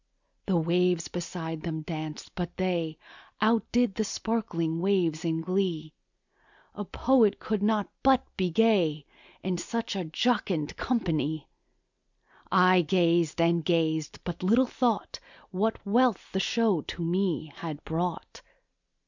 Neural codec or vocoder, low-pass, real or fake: none; 7.2 kHz; real